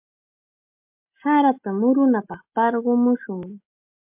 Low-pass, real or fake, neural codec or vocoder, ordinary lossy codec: 3.6 kHz; real; none; MP3, 32 kbps